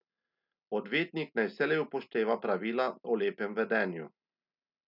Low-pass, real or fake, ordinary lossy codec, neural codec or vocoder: 5.4 kHz; real; none; none